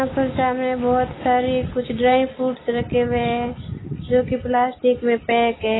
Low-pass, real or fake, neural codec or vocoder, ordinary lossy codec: 7.2 kHz; real; none; AAC, 16 kbps